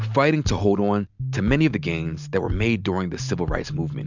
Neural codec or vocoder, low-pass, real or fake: none; 7.2 kHz; real